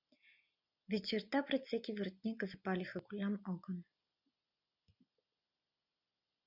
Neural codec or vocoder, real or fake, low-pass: none; real; 5.4 kHz